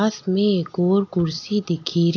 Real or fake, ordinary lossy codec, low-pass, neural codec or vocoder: real; AAC, 48 kbps; 7.2 kHz; none